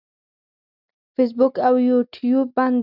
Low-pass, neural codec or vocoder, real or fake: 5.4 kHz; none; real